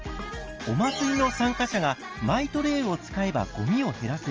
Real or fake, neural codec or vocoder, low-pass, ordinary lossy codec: real; none; 7.2 kHz; Opus, 24 kbps